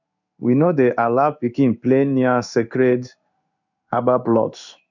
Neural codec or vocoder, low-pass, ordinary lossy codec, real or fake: codec, 16 kHz in and 24 kHz out, 1 kbps, XY-Tokenizer; 7.2 kHz; none; fake